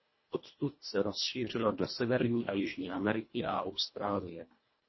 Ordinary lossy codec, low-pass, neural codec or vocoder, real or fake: MP3, 24 kbps; 7.2 kHz; codec, 24 kHz, 1.5 kbps, HILCodec; fake